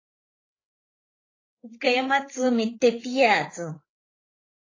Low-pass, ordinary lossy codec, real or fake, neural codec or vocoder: 7.2 kHz; AAC, 32 kbps; fake; codec, 16 kHz, 8 kbps, FreqCodec, larger model